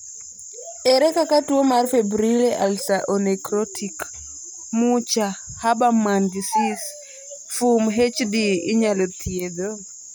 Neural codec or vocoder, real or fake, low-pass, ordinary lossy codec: none; real; none; none